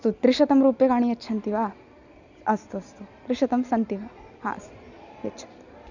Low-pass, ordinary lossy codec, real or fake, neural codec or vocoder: 7.2 kHz; none; real; none